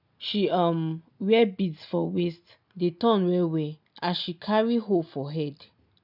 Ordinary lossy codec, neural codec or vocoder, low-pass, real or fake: none; none; 5.4 kHz; real